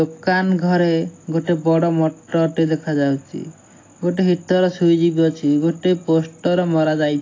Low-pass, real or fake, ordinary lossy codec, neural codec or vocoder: 7.2 kHz; real; AAC, 32 kbps; none